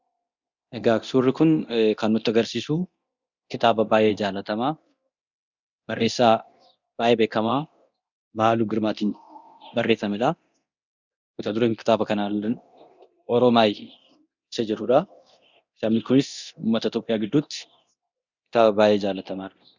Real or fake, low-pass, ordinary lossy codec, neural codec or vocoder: fake; 7.2 kHz; Opus, 64 kbps; codec, 24 kHz, 0.9 kbps, DualCodec